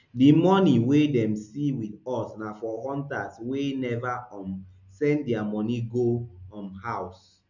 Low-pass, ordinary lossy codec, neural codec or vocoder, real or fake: 7.2 kHz; none; none; real